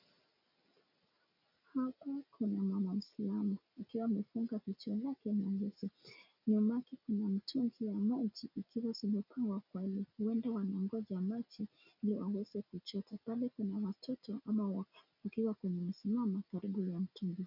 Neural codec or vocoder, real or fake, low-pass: none; real; 5.4 kHz